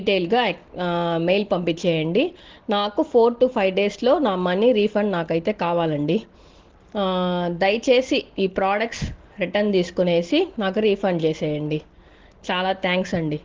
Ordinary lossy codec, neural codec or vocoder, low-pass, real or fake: Opus, 16 kbps; none; 7.2 kHz; real